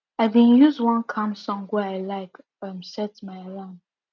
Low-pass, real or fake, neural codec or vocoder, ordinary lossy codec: 7.2 kHz; real; none; none